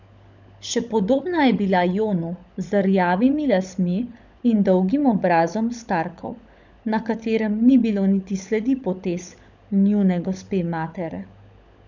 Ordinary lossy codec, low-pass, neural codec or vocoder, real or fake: none; 7.2 kHz; codec, 16 kHz, 16 kbps, FunCodec, trained on LibriTTS, 50 frames a second; fake